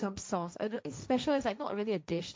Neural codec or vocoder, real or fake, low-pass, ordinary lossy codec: codec, 16 kHz, 1.1 kbps, Voila-Tokenizer; fake; none; none